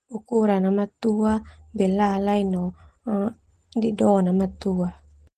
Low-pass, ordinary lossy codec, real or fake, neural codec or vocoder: 14.4 kHz; Opus, 16 kbps; real; none